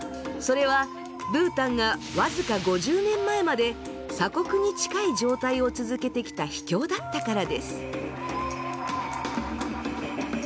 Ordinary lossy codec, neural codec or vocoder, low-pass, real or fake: none; none; none; real